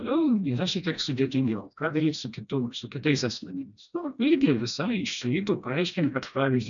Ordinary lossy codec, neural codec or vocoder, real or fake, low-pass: MP3, 96 kbps; codec, 16 kHz, 1 kbps, FreqCodec, smaller model; fake; 7.2 kHz